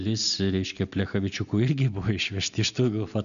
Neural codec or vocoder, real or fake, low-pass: none; real; 7.2 kHz